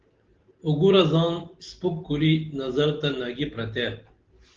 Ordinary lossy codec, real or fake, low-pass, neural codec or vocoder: Opus, 16 kbps; real; 7.2 kHz; none